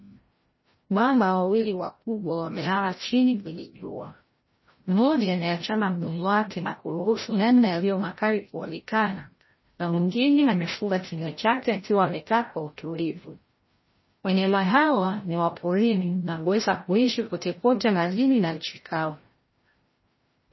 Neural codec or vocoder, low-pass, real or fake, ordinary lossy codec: codec, 16 kHz, 0.5 kbps, FreqCodec, larger model; 7.2 kHz; fake; MP3, 24 kbps